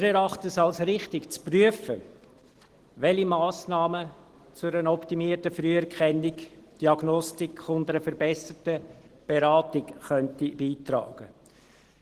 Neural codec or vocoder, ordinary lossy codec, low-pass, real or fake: none; Opus, 16 kbps; 14.4 kHz; real